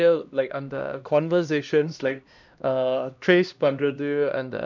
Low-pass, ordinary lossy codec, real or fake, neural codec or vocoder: 7.2 kHz; none; fake; codec, 16 kHz, 1 kbps, X-Codec, HuBERT features, trained on LibriSpeech